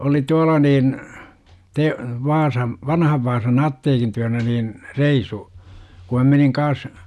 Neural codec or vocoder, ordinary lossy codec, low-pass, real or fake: none; none; none; real